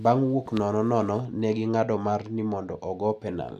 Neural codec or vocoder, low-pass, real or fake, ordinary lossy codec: none; 14.4 kHz; real; none